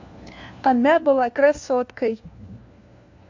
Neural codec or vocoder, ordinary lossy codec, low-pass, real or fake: codec, 16 kHz, 1 kbps, FunCodec, trained on LibriTTS, 50 frames a second; MP3, 48 kbps; 7.2 kHz; fake